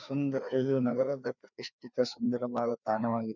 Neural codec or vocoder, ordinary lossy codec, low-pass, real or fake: codec, 16 kHz, 2 kbps, FreqCodec, larger model; none; 7.2 kHz; fake